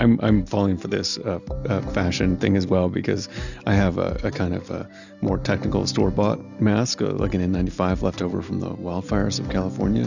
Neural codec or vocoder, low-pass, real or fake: none; 7.2 kHz; real